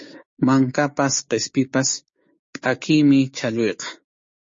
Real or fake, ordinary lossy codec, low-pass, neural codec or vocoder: fake; MP3, 32 kbps; 7.2 kHz; codec, 16 kHz, 6 kbps, DAC